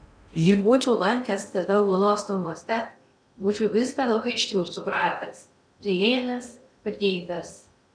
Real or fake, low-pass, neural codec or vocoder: fake; 9.9 kHz; codec, 16 kHz in and 24 kHz out, 0.6 kbps, FocalCodec, streaming, 2048 codes